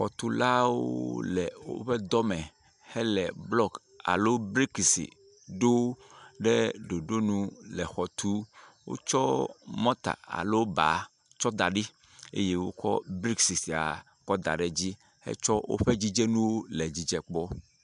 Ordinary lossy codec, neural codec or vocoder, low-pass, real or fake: MP3, 96 kbps; none; 10.8 kHz; real